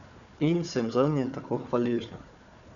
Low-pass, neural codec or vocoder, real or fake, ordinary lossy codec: 7.2 kHz; codec, 16 kHz, 4 kbps, FunCodec, trained on Chinese and English, 50 frames a second; fake; none